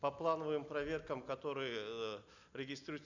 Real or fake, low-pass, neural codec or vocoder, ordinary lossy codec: real; 7.2 kHz; none; none